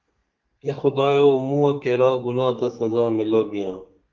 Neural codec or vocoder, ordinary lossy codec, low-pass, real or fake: codec, 32 kHz, 1.9 kbps, SNAC; Opus, 32 kbps; 7.2 kHz; fake